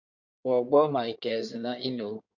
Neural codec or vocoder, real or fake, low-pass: codec, 16 kHz in and 24 kHz out, 2.2 kbps, FireRedTTS-2 codec; fake; 7.2 kHz